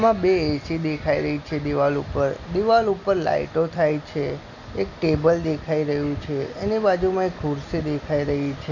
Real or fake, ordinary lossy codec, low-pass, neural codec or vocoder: real; none; 7.2 kHz; none